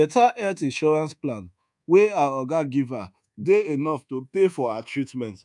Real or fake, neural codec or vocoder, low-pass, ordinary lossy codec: fake; codec, 24 kHz, 1.2 kbps, DualCodec; 10.8 kHz; none